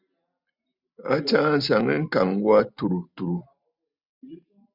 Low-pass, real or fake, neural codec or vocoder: 5.4 kHz; real; none